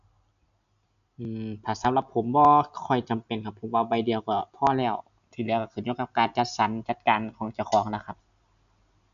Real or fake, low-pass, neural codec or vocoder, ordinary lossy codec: real; 7.2 kHz; none; none